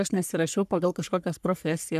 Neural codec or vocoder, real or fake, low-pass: codec, 44.1 kHz, 3.4 kbps, Pupu-Codec; fake; 14.4 kHz